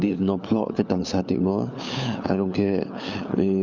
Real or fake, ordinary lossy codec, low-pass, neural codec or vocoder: fake; none; 7.2 kHz; codec, 16 kHz, 4 kbps, FunCodec, trained on LibriTTS, 50 frames a second